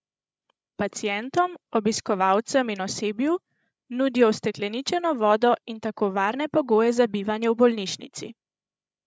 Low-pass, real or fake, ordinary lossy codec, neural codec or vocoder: none; fake; none; codec, 16 kHz, 16 kbps, FreqCodec, larger model